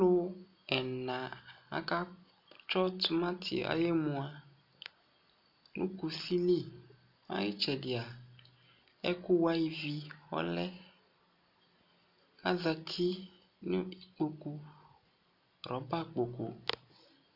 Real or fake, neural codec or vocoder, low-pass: real; none; 5.4 kHz